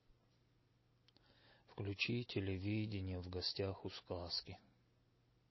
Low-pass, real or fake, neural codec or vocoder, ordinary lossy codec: 7.2 kHz; real; none; MP3, 24 kbps